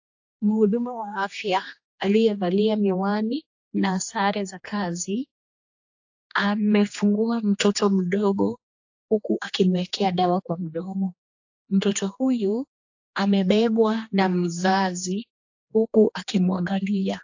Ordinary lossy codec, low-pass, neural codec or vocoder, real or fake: AAC, 48 kbps; 7.2 kHz; codec, 16 kHz, 2 kbps, X-Codec, HuBERT features, trained on general audio; fake